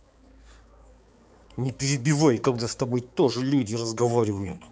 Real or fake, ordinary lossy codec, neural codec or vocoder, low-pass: fake; none; codec, 16 kHz, 4 kbps, X-Codec, HuBERT features, trained on balanced general audio; none